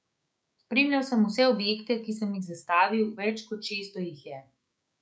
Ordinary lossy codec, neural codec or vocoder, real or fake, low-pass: none; codec, 16 kHz, 6 kbps, DAC; fake; none